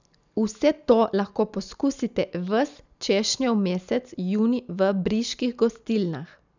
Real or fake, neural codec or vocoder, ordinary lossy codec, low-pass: real; none; none; 7.2 kHz